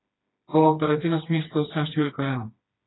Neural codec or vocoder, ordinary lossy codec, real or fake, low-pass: codec, 16 kHz, 2 kbps, FreqCodec, smaller model; AAC, 16 kbps; fake; 7.2 kHz